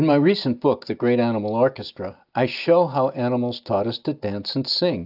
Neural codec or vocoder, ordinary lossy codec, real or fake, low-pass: none; AAC, 48 kbps; real; 5.4 kHz